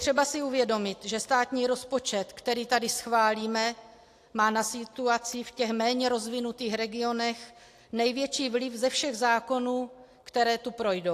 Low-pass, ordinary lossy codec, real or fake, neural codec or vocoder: 14.4 kHz; AAC, 64 kbps; real; none